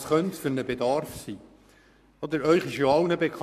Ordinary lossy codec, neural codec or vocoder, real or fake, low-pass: MP3, 96 kbps; codec, 44.1 kHz, 7.8 kbps, Pupu-Codec; fake; 14.4 kHz